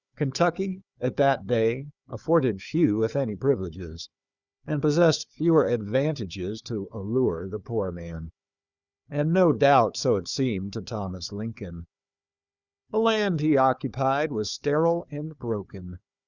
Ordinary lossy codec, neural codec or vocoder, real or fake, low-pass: Opus, 64 kbps; codec, 16 kHz, 4 kbps, FunCodec, trained on Chinese and English, 50 frames a second; fake; 7.2 kHz